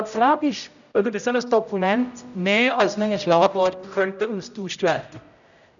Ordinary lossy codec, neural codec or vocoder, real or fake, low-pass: none; codec, 16 kHz, 0.5 kbps, X-Codec, HuBERT features, trained on general audio; fake; 7.2 kHz